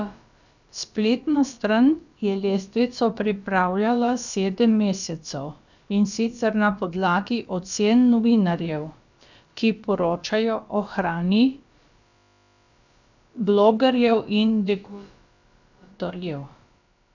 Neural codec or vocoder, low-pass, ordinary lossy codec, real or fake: codec, 16 kHz, about 1 kbps, DyCAST, with the encoder's durations; 7.2 kHz; none; fake